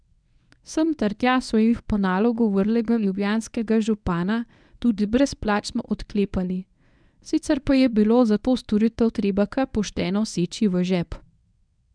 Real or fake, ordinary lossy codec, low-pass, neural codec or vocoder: fake; none; 9.9 kHz; codec, 24 kHz, 0.9 kbps, WavTokenizer, medium speech release version 1